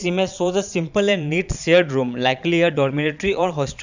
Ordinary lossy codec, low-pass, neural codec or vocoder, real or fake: none; 7.2 kHz; vocoder, 22.05 kHz, 80 mel bands, Vocos; fake